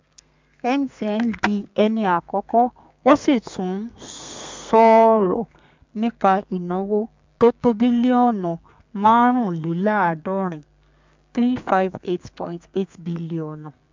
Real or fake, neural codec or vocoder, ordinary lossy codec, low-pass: fake; codec, 32 kHz, 1.9 kbps, SNAC; MP3, 64 kbps; 7.2 kHz